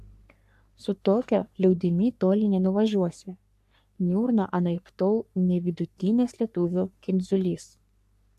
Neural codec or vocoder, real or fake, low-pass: codec, 44.1 kHz, 3.4 kbps, Pupu-Codec; fake; 14.4 kHz